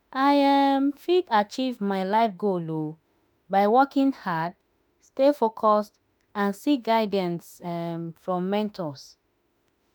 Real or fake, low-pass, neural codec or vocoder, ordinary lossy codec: fake; 19.8 kHz; autoencoder, 48 kHz, 32 numbers a frame, DAC-VAE, trained on Japanese speech; none